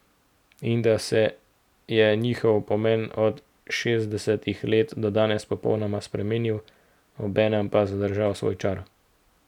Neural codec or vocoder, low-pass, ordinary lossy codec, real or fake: none; 19.8 kHz; none; real